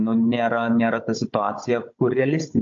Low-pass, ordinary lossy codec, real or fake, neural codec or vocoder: 7.2 kHz; AAC, 64 kbps; fake; codec, 16 kHz, 16 kbps, FunCodec, trained on Chinese and English, 50 frames a second